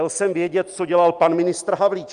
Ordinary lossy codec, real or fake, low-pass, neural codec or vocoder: Opus, 32 kbps; real; 9.9 kHz; none